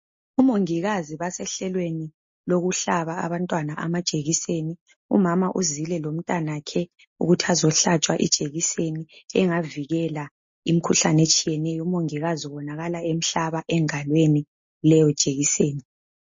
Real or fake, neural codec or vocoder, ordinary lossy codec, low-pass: real; none; MP3, 32 kbps; 7.2 kHz